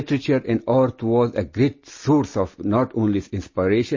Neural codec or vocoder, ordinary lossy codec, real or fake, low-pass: none; MP3, 32 kbps; real; 7.2 kHz